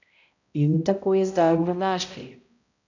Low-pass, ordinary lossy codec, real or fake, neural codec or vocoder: 7.2 kHz; none; fake; codec, 16 kHz, 0.5 kbps, X-Codec, HuBERT features, trained on balanced general audio